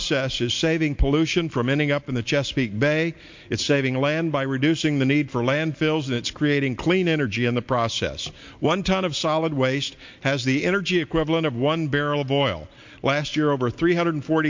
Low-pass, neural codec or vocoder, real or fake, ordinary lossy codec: 7.2 kHz; none; real; MP3, 48 kbps